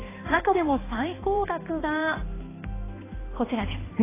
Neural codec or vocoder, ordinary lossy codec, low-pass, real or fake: codec, 16 kHz, 2 kbps, X-Codec, HuBERT features, trained on balanced general audio; AAC, 16 kbps; 3.6 kHz; fake